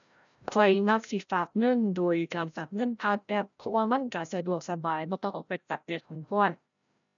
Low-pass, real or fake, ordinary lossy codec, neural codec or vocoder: 7.2 kHz; fake; none; codec, 16 kHz, 0.5 kbps, FreqCodec, larger model